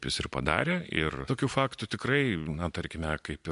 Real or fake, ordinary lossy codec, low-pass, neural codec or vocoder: real; MP3, 64 kbps; 10.8 kHz; none